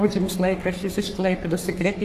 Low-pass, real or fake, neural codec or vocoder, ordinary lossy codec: 14.4 kHz; fake; codec, 44.1 kHz, 3.4 kbps, Pupu-Codec; AAC, 64 kbps